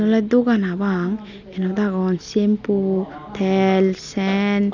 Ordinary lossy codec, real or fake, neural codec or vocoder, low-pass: none; real; none; 7.2 kHz